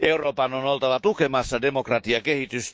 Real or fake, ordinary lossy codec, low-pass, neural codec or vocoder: fake; none; none; codec, 16 kHz, 6 kbps, DAC